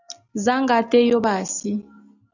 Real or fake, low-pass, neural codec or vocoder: real; 7.2 kHz; none